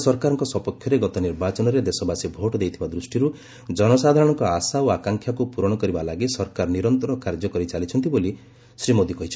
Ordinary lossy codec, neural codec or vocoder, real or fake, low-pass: none; none; real; none